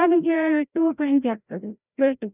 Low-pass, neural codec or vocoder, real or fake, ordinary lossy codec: 3.6 kHz; codec, 16 kHz, 0.5 kbps, FreqCodec, larger model; fake; none